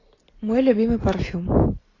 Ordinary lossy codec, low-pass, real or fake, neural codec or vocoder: AAC, 32 kbps; 7.2 kHz; real; none